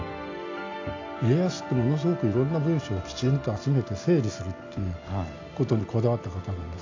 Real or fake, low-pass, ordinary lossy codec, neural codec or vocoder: real; 7.2 kHz; AAC, 48 kbps; none